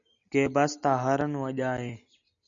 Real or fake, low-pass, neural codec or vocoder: real; 7.2 kHz; none